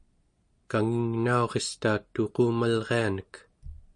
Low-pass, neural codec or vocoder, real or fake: 9.9 kHz; none; real